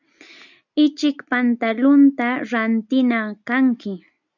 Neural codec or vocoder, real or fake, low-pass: none; real; 7.2 kHz